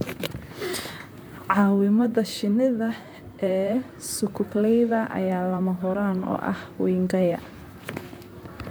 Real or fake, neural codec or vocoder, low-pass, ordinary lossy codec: fake; vocoder, 44.1 kHz, 128 mel bands, Pupu-Vocoder; none; none